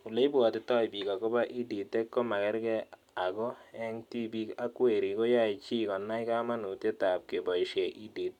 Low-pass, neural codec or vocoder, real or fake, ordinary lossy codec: 19.8 kHz; none; real; none